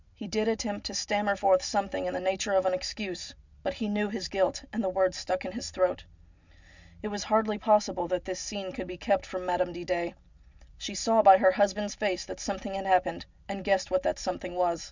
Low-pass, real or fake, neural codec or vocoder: 7.2 kHz; real; none